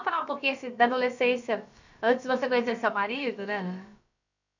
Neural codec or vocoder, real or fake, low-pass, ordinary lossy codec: codec, 16 kHz, about 1 kbps, DyCAST, with the encoder's durations; fake; 7.2 kHz; none